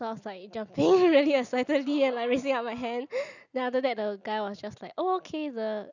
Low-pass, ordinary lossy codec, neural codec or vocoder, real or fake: 7.2 kHz; none; none; real